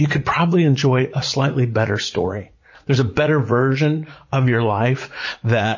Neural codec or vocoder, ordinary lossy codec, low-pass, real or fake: vocoder, 44.1 kHz, 80 mel bands, Vocos; MP3, 32 kbps; 7.2 kHz; fake